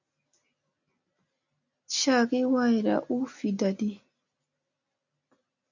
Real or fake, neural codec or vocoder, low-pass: real; none; 7.2 kHz